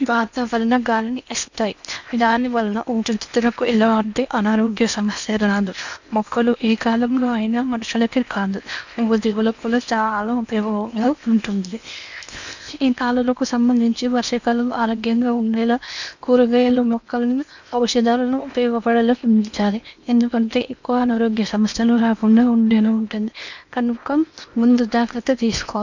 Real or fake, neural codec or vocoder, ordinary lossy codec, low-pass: fake; codec, 16 kHz in and 24 kHz out, 0.8 kbps, FocalCodec, streaming, 65536 codes; none; 7.2 kHz